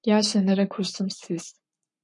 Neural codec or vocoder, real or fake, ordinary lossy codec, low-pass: codec, 44.1 kHz, 7.8 kbps, Pupu-Codec; fake; MP3, 64 kbps; 10.8 kHz